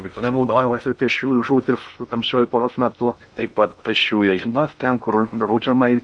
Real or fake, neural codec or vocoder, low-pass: fake; codec, 16 kHz in and 24 kHz out, 0.6 kbps, FocalCodec, streaming, 2048 codes; 9.9 kHz